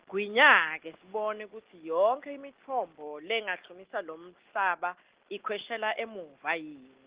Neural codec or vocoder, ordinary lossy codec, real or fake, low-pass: none; Opus, 16 kbps; real; 3.6 kHz